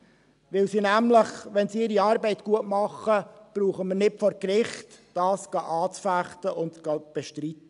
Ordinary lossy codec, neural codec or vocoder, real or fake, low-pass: none; none; real; 10.8 kHz